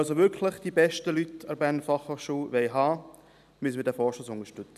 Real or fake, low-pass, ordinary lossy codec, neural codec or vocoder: real; 14.4 kHz; none; none